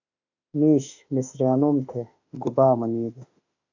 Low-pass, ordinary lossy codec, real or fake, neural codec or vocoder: 7.2 kHz; AAC, 48 kbps; fake; autoencoder, 48 kHz, 32 numbers a frame, DAC-VAE, trained on Japanese speech